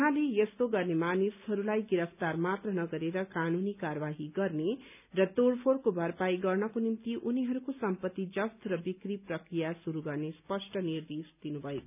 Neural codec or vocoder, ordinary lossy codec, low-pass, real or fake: none; none; 3.6 kHz; real